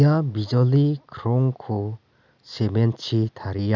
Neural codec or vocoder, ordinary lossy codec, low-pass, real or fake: none; none; 7.2 kHz; real